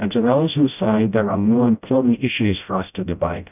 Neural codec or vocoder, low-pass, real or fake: codec, 16 kHz, 0.5 kbps, FreqCodec, smaller model; 3.6 kHz; fake